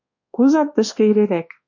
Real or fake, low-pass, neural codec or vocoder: fake; 7.2 kHz; codec, 24 kHz, 1.2 kbps, DualCodec